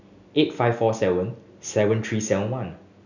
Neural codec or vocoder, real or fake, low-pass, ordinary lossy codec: none; real; 7.2 kHz; none